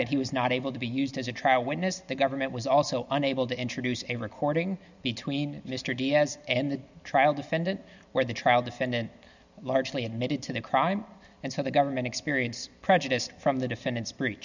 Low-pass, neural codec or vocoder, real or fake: 7.2 kHz; none; real